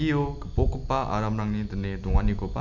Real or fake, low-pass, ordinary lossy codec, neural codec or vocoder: real; 7.2 kHz; none; none